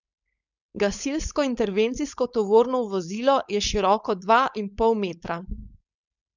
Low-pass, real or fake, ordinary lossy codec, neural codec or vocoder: 7.2 kHz; fake; none; codec, 16 kHz, 4.8 kbps, FACodec